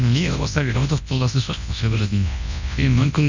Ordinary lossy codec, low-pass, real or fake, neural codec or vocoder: none; 7.2 kHz; fake; codec, 24 kHz, 0.9 kbps, WavTokenizer, large speech release